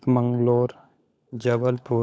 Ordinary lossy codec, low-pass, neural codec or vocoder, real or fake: none; none; codec, 16 kHz, 16 kbps, FunCodec, trained on LibriTTS, 50 frames a second; fake